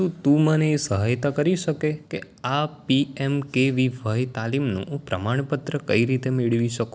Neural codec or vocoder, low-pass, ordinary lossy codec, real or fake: none; none; none; real